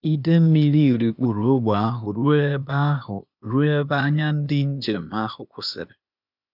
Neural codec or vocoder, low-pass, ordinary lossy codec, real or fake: codec, 16 kHz, 0.8 kbps, ZipCodec; 5.4 kHz; none; fake